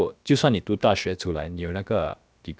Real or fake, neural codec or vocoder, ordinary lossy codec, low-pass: fake; codec, 16 kHz, about 1 kbps, DyCAST, with the encoder's durations; none; none